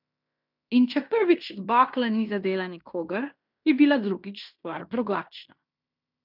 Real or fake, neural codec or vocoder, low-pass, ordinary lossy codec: fake; codec, 16 kHz in and 24 kHz out, 0.9 kbps, LongCat-Audio-Codec, fine tuned four codebook decoder; 5.4 kHz; none